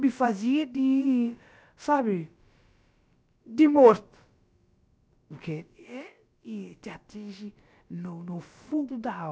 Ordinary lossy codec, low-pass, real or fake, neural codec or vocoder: none; none; fake; codec, 16 kHz, about 1 kbps, DyCAST, with the encoder's durations